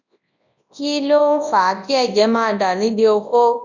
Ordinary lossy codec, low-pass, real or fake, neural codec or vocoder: none; 7.2 kHz; fake; codec, 24 kHz, 0.9 kbps, WavTokenizer, large speech release